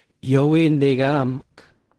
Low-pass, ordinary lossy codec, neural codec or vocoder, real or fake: 10.8 kHz; Opus, 16 kbps; codec, 16 kHz in and 24 kHz out, 0.4 kbps, LongCat-Audio-Codec, fine tuned four codebook decoder; fake